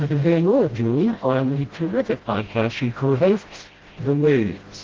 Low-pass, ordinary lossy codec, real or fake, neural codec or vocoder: 7.2 kHz; Opus, 16 kbps; fake; codec, 16 kHz, 0.5 kbps, FreqCodec, smaller model